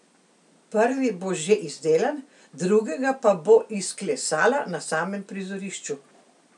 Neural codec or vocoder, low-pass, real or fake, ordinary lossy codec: none; 10.8 kHz; real; none